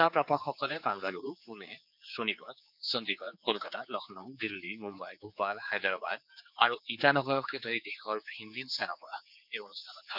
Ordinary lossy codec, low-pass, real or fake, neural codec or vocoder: none; 5.4 kHz; fake; codec, 16 kHz, 4 kbps, X-Codec, HuBERT features, trained on general audio